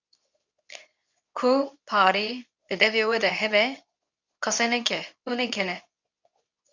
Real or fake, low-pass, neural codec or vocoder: fake; 7.2 kHz; codec, 24 kHz, 0.9 kbps, WavTokenizer, medium speech release version 2